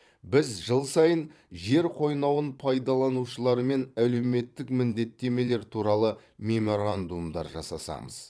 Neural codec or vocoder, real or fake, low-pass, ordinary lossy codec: vocoder, 22.05 kHz, 80 mel bands, Vocos; fake; none; none